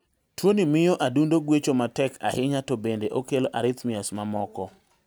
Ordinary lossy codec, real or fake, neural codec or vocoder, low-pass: none; real; none; none